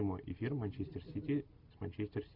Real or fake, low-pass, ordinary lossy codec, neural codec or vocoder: real; 5.4 kHz; MP3, 48 kbps; none